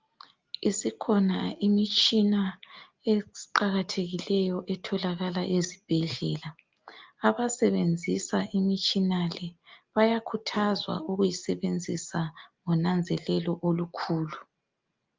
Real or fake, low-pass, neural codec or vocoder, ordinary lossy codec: real; 7.2 kHz; none; Opus, 24 kbps